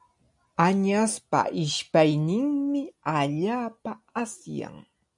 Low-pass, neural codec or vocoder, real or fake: 10.8 kHz; none; real